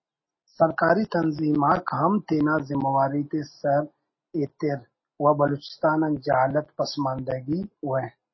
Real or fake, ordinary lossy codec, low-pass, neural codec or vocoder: real; MP3, 24 kbps; 7.2 kHz; none